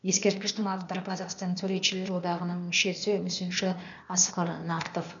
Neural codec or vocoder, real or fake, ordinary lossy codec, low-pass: codec, 16 kHz, 0.8 kbps, ZipCodec; fake; none; 7.2 kHz